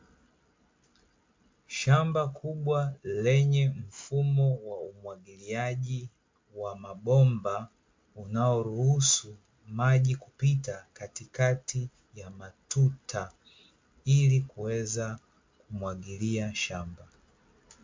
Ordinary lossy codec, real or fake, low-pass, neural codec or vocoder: MP3, 48 kbps; real; 7.2 kHz; none